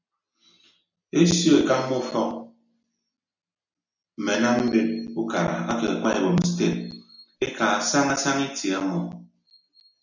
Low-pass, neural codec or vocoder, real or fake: 7.2 kHz; none; real